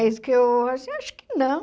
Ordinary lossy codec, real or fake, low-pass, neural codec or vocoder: none; real; none; none